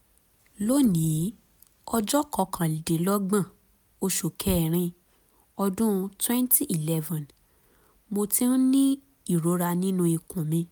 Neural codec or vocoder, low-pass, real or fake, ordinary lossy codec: none; none; real; none